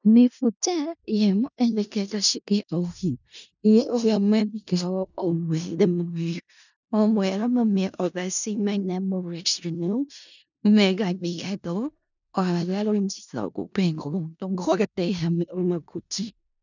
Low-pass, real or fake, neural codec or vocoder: 7.2 kHz; fake; codec, 16 kHz in and 24 kHz out, 0.4 kbps, LongCat-Audio-Codec, four codebook decoder